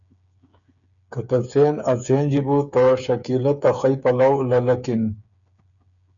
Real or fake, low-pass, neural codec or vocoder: fake; 7.2 kHz; codec, 16 kHz, 8 kbps, FreqCodec, smaller model